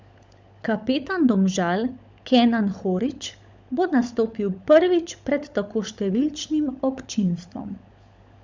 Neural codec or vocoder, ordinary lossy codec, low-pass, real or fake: codec, 16 kHz, 16 kbps, FunCodec, trained on LibriTTS, 50 frames a second; none; none; fake